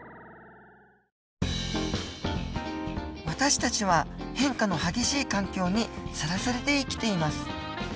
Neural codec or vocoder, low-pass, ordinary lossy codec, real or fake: none; none; none; real